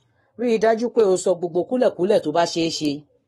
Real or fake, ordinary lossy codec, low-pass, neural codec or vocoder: fake; AAC, 32 kbps; 19.8 kHz; codec, 44.1 kHz, 7.8 kbps, Pupu-Codec